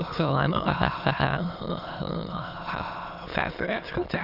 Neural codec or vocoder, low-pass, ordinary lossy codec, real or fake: autoencoder, 22.05 kHz, a latent of 192 numbers a frame, VITS, trained on many speakers; 5.4 kHz; none; fake